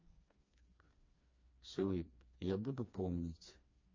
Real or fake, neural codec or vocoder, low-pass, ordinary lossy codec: fake; codec, 16 kHz, 2 kbps, FreqCodec, smaller model; 7.2 kHz; MP3, 32 kbps